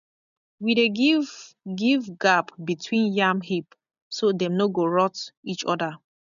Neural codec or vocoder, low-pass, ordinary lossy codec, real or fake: none; 7.2 kHz; none; real